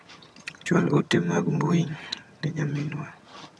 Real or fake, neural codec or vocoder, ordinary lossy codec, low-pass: fake; vocoder, 22.05 kHz, 80 mel bands, HiFi-GAN; none; none